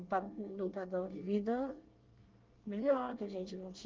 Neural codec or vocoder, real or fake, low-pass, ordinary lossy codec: codec, 24 kHz, 1 kbps, SNAC; fake; 7.2 kHz; Opus, 32 kbps